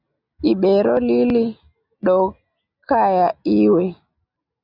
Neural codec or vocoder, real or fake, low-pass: none; real; 5.4 kHz